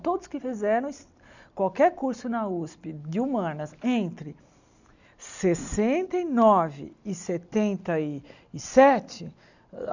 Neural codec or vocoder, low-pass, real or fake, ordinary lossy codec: none; 7.2 kHz; real; none